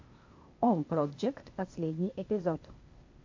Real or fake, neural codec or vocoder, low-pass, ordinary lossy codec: fake; codec, 16 kHz, 0.8 kbps, ZipCodec; 7.2 kHz; MP3, 48 kbps